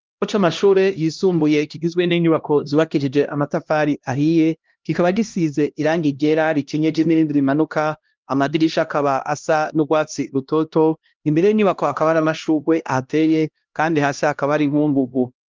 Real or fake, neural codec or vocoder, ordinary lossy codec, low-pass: fake; codec, 16 kHz, 1 kbps, X-Codec, HuBERT features, trained on LibriSpeech; Opus, 32 kbps; 7.2 kHz